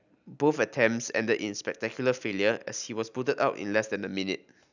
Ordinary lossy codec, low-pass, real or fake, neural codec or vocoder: none; 7.2 kHz; real; none